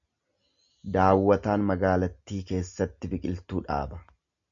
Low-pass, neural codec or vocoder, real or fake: 7.2 kHz; none; real